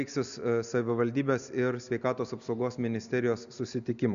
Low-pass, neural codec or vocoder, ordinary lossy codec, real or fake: 7.2 kHz; none; MP3, 96 kbps; real